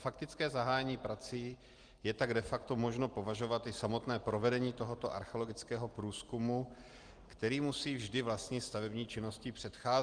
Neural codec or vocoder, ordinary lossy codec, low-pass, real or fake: none; Opus, 24 kbps; 9.9 kHz; real